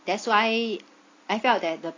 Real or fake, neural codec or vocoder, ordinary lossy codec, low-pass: real; none; AAC, 32 kbps; 7.2 kHz